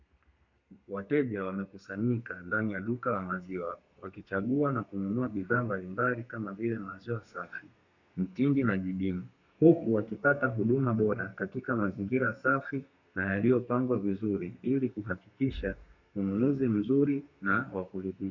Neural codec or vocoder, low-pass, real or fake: codec, 32 kHz, 1.9 kbps, SNAC; 7.2 kHz; fake